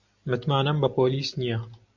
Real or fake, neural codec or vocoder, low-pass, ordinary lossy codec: real; none; 7.2 kHz; AAC, 48 kbps